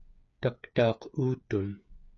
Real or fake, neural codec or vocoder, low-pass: fake; codec, 16 kHz, 8 kbps, FreqCodec, smaller model; 7.2 kHz